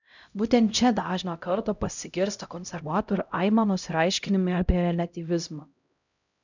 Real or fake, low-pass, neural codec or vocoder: fake; 7.2 kHz; codec, 16 kHz, 0.5 kbps, X-Codec, HuBERT features, trained on LibriSpeech